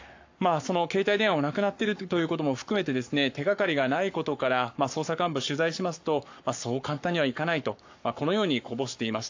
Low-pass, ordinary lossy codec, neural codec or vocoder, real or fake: 7.2 kHz; AAC, 48 kbps; codec, 44.1 kHz, 7.8 kbps, Pupu-Codec; fake